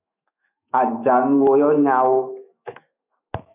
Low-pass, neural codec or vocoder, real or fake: 3.6 kHz; autoencoder, 48 kHz, 128 numbers a frame, DAC-VAE, trained on Japanese speech; fake